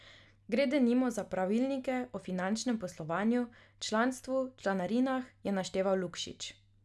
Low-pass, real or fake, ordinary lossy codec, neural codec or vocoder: none; real; none; none